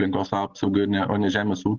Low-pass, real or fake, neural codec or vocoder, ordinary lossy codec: 7.2 kHz; real; none; Opus, 16 kbps